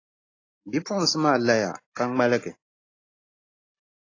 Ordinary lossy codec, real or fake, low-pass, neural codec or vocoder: AAC, 32 kbps; real; 7.2 kHz; none